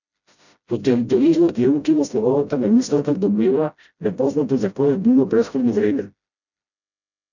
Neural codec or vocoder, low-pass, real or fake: codec, 16 kHz, 0.5 kbps, FreqCodec, smaller model; 7.2 kHz; fake